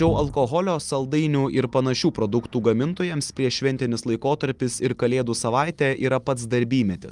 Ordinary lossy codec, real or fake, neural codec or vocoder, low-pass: Opus, 32 kbps; real; none; 10.8 kHz